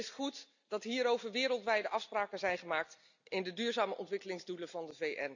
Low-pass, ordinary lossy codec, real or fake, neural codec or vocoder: 7.2 kHz; none; real; none